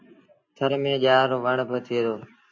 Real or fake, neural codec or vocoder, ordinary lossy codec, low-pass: real; none; AAC, 48 kbps; 7.2 kHz